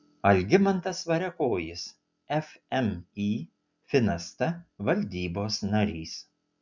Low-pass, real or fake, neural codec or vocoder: 7.2 kHz; real; none